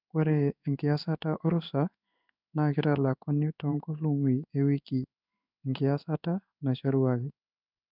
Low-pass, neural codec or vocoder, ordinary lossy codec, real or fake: 5.4 kHz; codec, 16 kHz in and 24 kHz out, 1 kbps, XY-Tokenizer; none; fake